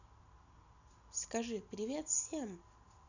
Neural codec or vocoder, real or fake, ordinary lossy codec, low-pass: none; real; none; 7.2 kHz